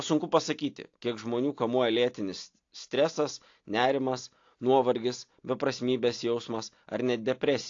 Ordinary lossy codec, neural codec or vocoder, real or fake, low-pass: AAC, 48 kbps; none; real; 7.2 kHz